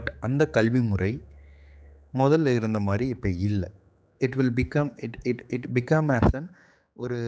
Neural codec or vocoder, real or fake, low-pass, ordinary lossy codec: codec, 16 kHz, 4 kbps, X-Codec, HuBERT features, trained on general audio; fake; none; none